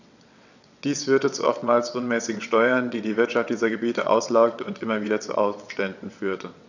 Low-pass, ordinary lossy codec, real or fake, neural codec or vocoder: 7.2 kHz; none; fake; vocoder, 22.05 kHz, 80 mel bands, WaveNeXt